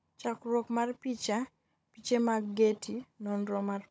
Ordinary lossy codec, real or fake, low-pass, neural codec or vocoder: none; fake; none; codec, 16 kHz, 16 kbps, FunCodec, trained on Chinese and English, 50 frames a second